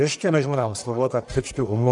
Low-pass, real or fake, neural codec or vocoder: 10.8 kHz; fake; codec, 44.1 kHz, 1.7 kbps, Pupu-Codec